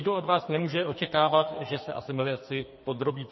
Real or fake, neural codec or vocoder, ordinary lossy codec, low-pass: fake; codec, 44.1 kHz, 2.6 kbps, SNAC; MP3, 24 kbps; 7.2 kHz